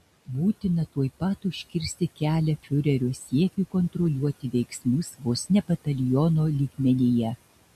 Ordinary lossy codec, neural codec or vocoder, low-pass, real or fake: MP3, 64 kbps; none; 14.4 kHz; real